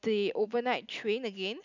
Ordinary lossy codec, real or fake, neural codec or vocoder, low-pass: none; real; none; 7.2 kHz